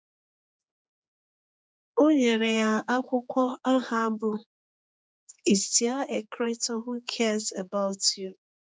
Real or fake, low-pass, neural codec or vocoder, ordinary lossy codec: fake; none; codec, 16 kHz, 4 kbps, X-Codec, HuBERT features, trained on general audio; none